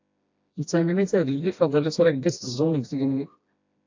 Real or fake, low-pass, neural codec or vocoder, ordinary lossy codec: fake; 7.2 kHz; codec, 16 kHz, 1 kbps, FreqCodec, smaller model; AAC, 48 kbps